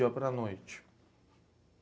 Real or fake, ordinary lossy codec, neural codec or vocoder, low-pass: real; none; none; none